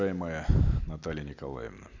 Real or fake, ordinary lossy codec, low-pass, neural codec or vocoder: real; none; 7.2 kHz; none